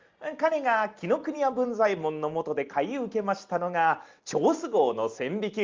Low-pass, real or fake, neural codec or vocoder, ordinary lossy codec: 7.2 kHz; real; none; Opus, 32 kbps